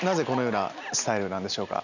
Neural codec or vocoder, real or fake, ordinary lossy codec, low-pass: none; real; none; 7.2 kHz